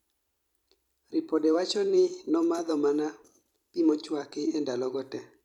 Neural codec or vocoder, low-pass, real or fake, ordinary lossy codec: vocoder, 44.1 kHz, 128 mel bands every 256 samples, BigVGAN v2; 19.8 kHz; fake; none